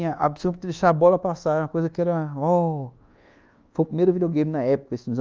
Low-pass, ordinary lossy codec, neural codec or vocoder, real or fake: 7.2 kHz; Opus, 24 kbps; codec, 24 kHz, 1.2 kbps, DualCodec; fake